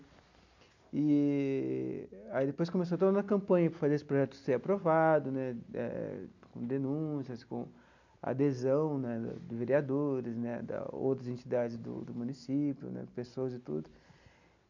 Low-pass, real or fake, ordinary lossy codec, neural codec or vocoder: 7.2 kHz; real; none; none